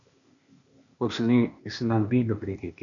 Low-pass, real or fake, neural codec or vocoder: 7.2 kHz; fake; codec, 16 kHz, 0.8 kbps, ZipCodec